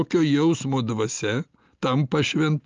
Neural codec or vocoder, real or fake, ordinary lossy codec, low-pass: none; real; Opus, 32 kbps; 7.2 kHz